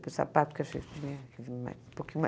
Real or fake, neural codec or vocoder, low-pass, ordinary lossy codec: real; none; none; none